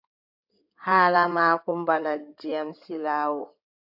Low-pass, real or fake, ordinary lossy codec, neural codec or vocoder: 5.4 kHz; fake; AAC, 48 kbps; codec, 16 kHz in and 24 kHz out, 2.2 kbps, FireRedTTS-2 codec